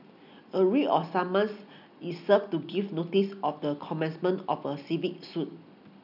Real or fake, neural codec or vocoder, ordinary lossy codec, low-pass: real; none; none; 5.4 kHz